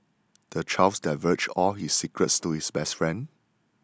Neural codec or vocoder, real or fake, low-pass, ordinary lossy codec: none; real; none; none